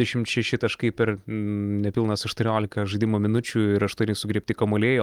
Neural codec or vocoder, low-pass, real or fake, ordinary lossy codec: none; 19.8 kHz; real; Opus, 32 kbps